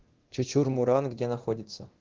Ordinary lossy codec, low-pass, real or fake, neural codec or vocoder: Opus, 16 kbps; 7.2 kHz; fake; codec, 24 kHz, 0.9 kbps, DualCodec